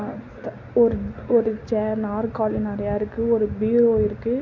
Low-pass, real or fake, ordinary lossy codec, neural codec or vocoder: 7.2 kHz; real; MP3, 48 kbps; none